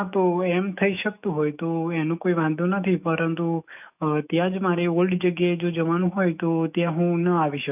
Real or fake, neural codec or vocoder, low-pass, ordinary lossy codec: fake; autoencoder, 48 kHz, 128 numbers a frame, DAC-VAE, trained on Japanese speech; 3.6 kHz; none